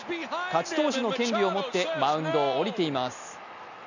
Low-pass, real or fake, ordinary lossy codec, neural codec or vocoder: 7.2 kHz; real; none; none